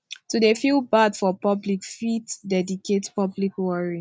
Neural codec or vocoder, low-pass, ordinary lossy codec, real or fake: none; none; none; real